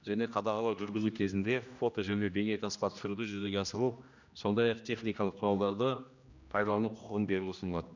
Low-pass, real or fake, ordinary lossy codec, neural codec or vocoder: 7.2 kHz; fake; none; codec, 16 kHz, 1 kbps, X-Codec, HuBERT features, trained on general audio